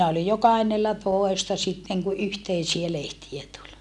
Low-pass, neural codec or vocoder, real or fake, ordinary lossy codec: none; none; real; none